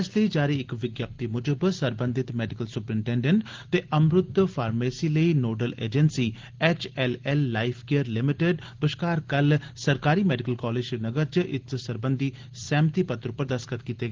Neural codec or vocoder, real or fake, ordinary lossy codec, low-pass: none; real; Opus, 16 kbps; 7.2 kHz